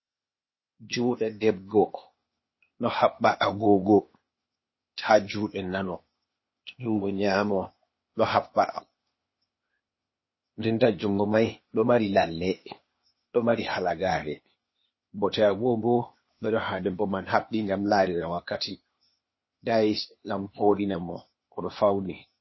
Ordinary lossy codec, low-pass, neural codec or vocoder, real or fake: MP3, 24 kbps; 7.2 kHz; codec, 16 kHz, 0.8 kbps, ZipCodec; fake